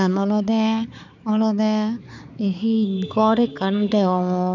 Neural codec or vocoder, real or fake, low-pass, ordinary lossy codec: codec, 16 kHz, 4 kbps, X-Codec, HuBERT features, trained on balanced general audio; fake; 7.2 kHz; none